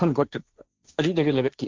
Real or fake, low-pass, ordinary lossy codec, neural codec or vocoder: fake; 7.2 kHz; Opus, 24 kbps; codec, 16 kHz, 1.1 kbps, Voila-Tokenizer